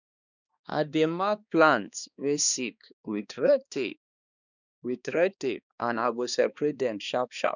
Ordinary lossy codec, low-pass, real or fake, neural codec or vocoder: none; 7.2 kHz; fake; codec, 16 kHz, 2 kbps, X-Codec, HuBERT features, trained on balanced general audio